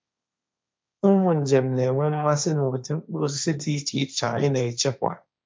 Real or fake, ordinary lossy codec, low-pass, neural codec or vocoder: fake; none; none; codec, 16 kHz, 1.1 kbps, Voila-Tokenizer